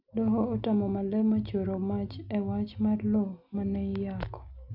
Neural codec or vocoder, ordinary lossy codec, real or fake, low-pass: none; none; real; 5.4 kHz